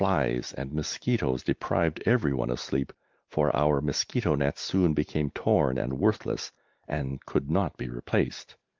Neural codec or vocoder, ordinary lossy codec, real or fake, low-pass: none; Opus, 32 kbps; real; 7.2 kHz